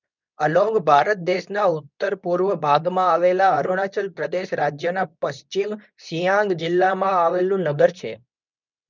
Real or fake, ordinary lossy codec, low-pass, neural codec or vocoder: fake; none; 7.2 kHz; codec, 24 kHz, 0.9 kbps, WavTokenizer, medium speech release version 2